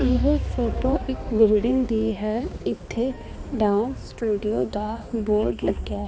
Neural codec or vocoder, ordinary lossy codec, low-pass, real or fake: codec, 16 kHz, 2 kbps, X-Codec, HuBERT features, trained on balanced general audio; none; none; fake